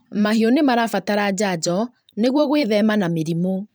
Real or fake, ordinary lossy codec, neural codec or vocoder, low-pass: fake; none; vocoder, 44.1 kHz, 128 mel bands every 512 samples, BigVGAN v2; none